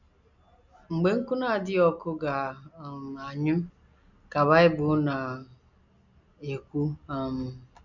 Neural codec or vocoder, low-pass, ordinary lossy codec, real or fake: none; 7.2 kHz; none; real